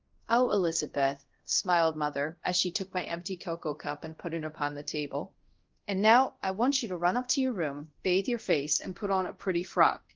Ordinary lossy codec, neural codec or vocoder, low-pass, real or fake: Opus, 16 kbps; codec, 24 kHz, 0.5 kbps, DualCodec; 7.2 kHz; fake